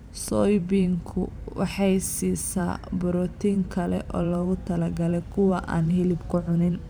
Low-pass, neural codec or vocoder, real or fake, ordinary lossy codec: none; vocoder, 44.1 kHz, 128 mel bands every 512 samples, BigVGAN v2; fake; none